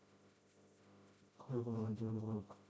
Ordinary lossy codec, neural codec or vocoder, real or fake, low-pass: none; codec, 16 kHz, 0.5 kbps, FreqCodec, smaller model; fake; none